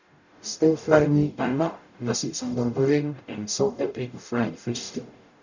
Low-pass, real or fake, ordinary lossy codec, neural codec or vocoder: 7.2 kHz; fake; none; codec, 44.1 kHz, 0.9 kbps, DAC